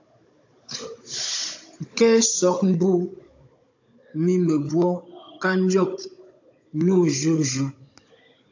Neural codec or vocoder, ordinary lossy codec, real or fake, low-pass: codec, 16 kHz, 16 kbps, FunCodec, trained on Chinese and English, 50 frames a second; AAC, 48 kbps; fake; 7.2 kHz